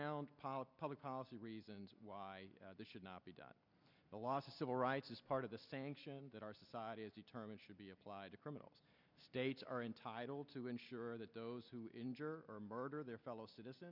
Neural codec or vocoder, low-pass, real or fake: none; 5.4 kHz; real